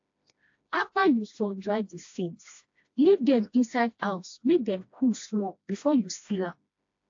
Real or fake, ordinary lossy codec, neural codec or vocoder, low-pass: fake; AAC, 48 kbps; codec, 16 kHz, 1 kbps, FreqCodec, smaller model; 7.2 kHz